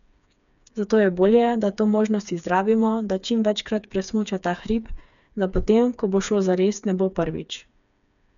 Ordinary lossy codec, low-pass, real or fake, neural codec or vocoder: none; 7.2 kHz; fake; codec, 16 kHz, 4 kbps, FreqCodec, smaller model